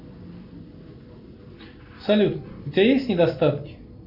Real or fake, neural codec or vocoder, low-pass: real; none; 5.4 kHz